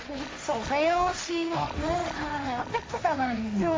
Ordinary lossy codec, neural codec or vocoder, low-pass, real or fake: none; codec, 16 kHz, 1.1 kbps, Voila-Tokenizer; none; fake